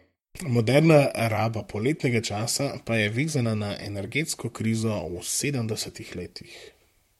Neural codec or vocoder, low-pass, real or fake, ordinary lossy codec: vocoder, 44.1 kHz, 128 mel bands, Pupu-Vocoder; 19.8 kHz; fake; MP3, 64 kbps